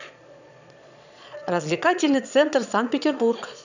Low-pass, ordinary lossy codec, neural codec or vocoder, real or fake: 7.2 kHz; none; vocoder, 22.05 kHz, 80 mel bands, Vocos; fake